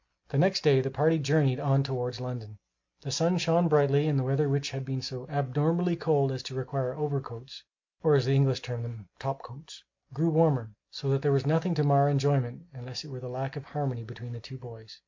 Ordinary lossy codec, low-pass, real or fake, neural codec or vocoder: MP3, 48 kbps; 7.2 kHz; real; none